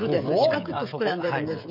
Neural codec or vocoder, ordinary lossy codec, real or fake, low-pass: codec, 16 kHz, 16 kbps, FreqCodec, smaller model; none; fake; 5.4 kHz